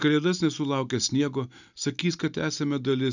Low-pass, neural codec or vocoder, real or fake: 7.2 kHz; none; real